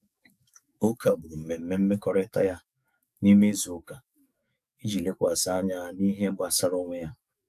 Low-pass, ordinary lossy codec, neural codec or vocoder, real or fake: 14.4 kHz; AAC, 96 kbps; codec, 44.1 kHz, 7.8 kbps, DAC; fake